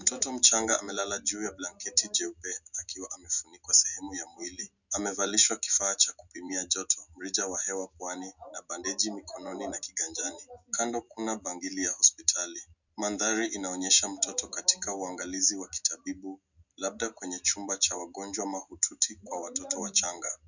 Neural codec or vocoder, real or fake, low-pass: none; real; 7.2 kHz